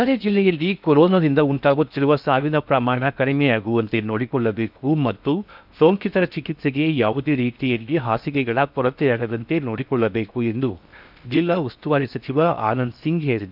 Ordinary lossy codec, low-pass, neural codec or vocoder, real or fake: none; 5.4 kHz; codec, 16 kHz in and 24 kHz out, 0.6 kbps, FocalCodec, streaming, 4096 codes; fake